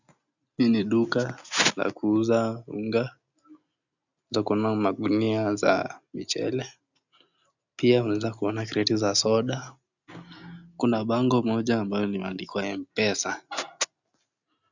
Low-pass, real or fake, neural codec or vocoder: 7.2 kHz; real; none